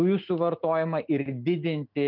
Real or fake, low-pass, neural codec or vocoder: real; 5.4 kHz; none